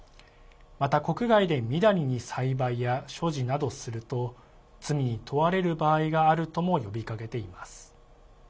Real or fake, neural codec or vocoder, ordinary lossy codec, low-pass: real; none; none; none